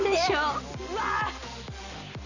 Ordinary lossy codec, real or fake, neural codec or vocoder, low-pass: none; real; none; 7.2 kHz